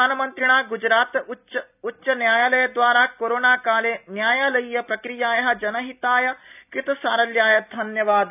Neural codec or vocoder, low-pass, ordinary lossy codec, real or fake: none; 3.6 kHz; none; real